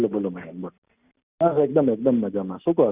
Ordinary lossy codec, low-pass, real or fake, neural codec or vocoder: Opus, 64 kbps; 3.6 kHz; real; none